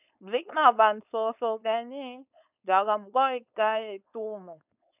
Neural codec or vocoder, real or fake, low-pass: codec, 16 kHz, 4.8 kbps, FACodec; fake; 3.6 kHz